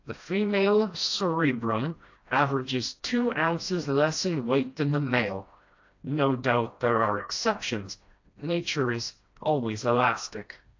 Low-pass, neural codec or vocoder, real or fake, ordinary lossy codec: 7.2 kHz; codec, 16 kHz, 1 kbps, FreqCodec, smaller model; fake; AAC, 48 kbps